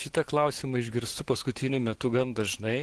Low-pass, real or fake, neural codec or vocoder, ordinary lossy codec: 10.8 kHz; fake; autoencoder, 48 kHz, 128 numbers a frame, DAC-VAE, trained on Japanese speech; Opus, 16 kbps